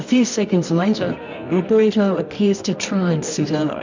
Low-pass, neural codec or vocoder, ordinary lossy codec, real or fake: 7.2 kHz; codec, 24 kHz, 0.9 kbps, WavTokenizer, medium music audio release; MP3, 64 kbps; fake